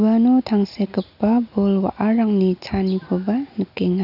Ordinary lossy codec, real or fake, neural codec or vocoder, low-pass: none; real; none; 5.4 kHz